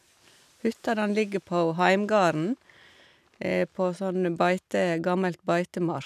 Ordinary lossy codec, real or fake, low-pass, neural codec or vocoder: none; real; 14.4 kHz; none